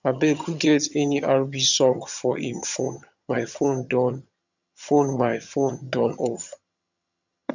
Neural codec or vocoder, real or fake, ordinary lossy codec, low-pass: vocoder, 22.05 kHz, 80 mel bands, HiFi-GAN; fake; none; 7.2 kHz